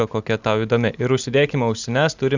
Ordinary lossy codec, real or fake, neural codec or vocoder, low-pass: Opus, 64 kbps; real; none; 7.2 kHz